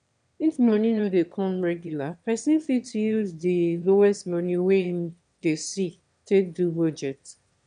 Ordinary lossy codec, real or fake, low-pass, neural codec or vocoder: none; fake; 9.9 kHz; autoencoder, 22.05 kHz, a latent of 192 numbers a frame, VITS, trained on one speaker